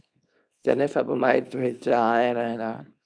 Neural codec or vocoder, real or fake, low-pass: codec, 24 kHz, 0.9 kbps, WavTokenizer, small release; fake; 9.9 kHz